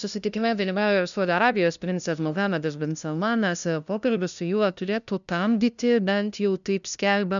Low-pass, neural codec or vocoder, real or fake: 7.2 kHz; codec, 16 kHz, 0.5 kbps, FunCodec, trained on LibriTTS, 25 frames a second; fake